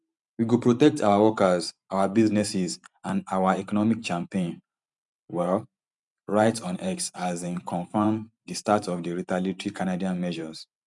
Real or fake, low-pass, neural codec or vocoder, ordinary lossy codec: fake; 10.8 kHz; vocoder, 44.1 kHz, 128 mel bands every 512 samples, BigVGAN v2; none